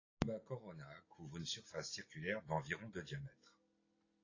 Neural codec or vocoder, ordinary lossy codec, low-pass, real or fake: none; AAC, 32 kbps; 7.2 kHz; real